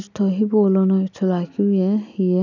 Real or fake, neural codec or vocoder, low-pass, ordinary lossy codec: real; none; 7.2 kHz; none